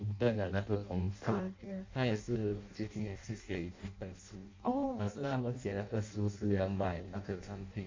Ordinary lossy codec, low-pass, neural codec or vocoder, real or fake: MP3, 64 kbps; 7.2 kHz; codec, 16 kHz in and 24 kHz out, 0.6 kbps, FireRedTTS-2 codec; fake